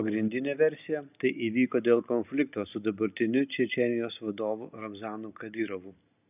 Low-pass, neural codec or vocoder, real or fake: 3.6 kHz; none; real